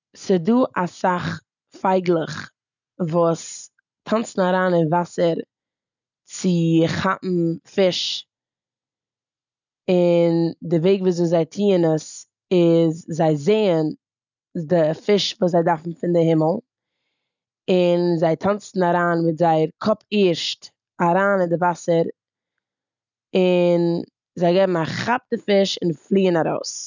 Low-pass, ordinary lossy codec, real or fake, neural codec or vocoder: 7.2 kHz; none; real; none